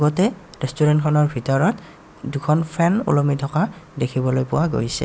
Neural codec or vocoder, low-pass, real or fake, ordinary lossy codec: none; none; real; none